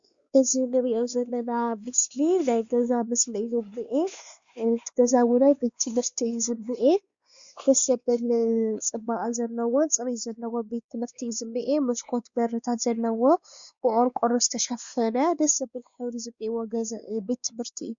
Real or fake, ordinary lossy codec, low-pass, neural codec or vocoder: fake; Opus, 64 kbps; 7.2 kHz; codec, 16 kHz, 2 kbps, X-Codec, WavLM features, trained on Multilingual LibriSpeech